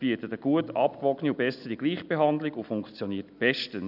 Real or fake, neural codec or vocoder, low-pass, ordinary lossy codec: real; none; 5.4 kHz; none